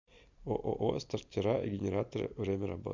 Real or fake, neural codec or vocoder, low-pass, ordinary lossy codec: real; none; 7.2 kHz; MP3, 64 kbps